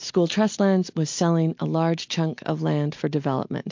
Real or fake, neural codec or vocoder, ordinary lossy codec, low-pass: real; none; MP3, 48 kbps; 7.2 kHz